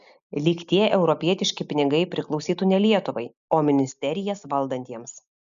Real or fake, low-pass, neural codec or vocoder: real; 7.2 kHz; none